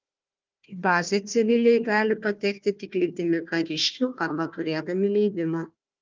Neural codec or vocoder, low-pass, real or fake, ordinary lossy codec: codec, 16 kHz, 1 kbps, FunCodec, trained on Chinese and English, 50 frames a second; 7.2 kHz; fake; Opus, 24 kbps